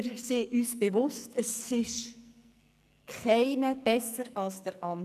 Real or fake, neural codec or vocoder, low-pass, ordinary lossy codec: fake; codec, 44.1 kHz, 2.6 kbps, SNAC; 14.4 kHz; none